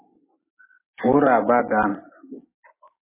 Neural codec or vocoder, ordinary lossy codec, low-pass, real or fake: none; MP3, 16 kbps; 3.6 kHz; real